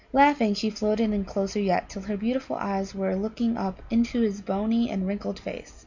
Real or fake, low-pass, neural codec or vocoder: real; 7.2 kHz; none